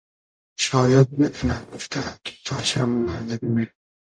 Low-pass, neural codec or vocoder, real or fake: 9.9 kHz; codec, 44.1 kHz, 0.9 kbps, DAC; fake